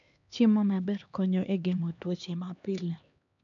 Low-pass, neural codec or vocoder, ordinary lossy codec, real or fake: 7.2 kHz; codec, 16 kHz, 2 kbps, X-Codec, HuBERT features, trained on LibriSpeech; none; fake